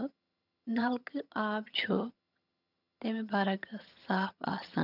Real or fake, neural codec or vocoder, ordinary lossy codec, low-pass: fake; vocoder, 22.05 kHz, 80 mel bands, HiFi-GAN; none; 5.4 kHz